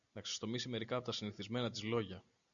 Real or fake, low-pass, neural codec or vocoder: real; 7.2 kHz; none